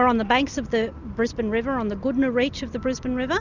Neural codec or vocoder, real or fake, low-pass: none; real; 7.2 kHz